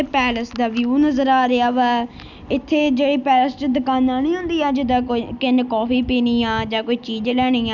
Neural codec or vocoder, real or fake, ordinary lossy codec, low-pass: none; real; none; 7.2 kHz